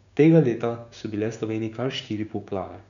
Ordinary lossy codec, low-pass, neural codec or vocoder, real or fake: none; 7.2 kHz; codec, 16 kHz, 6 kbps, DAC; fake